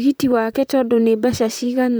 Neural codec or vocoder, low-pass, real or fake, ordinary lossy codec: vocoder, 44.1 kHz, 128 mel bands, Pupu-Vocoder; none; fake; none